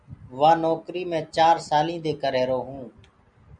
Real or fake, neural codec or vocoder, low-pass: real; none; 9.9 kHz